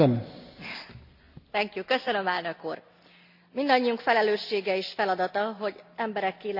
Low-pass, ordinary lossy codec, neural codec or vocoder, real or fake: 5.4 kHz; none; none; real